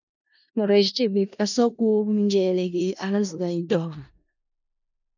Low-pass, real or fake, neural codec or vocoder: 7.2 kHz; fake; codec, 16 kHz in and 24 kHz out, 0.4 kbps, LongCat-Audio-Codec, four codebook decoder